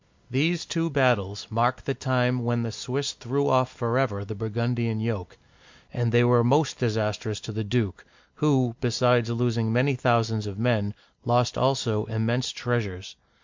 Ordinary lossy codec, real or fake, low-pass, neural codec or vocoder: MP3, 64 kbps; real; 7.2 kHz; none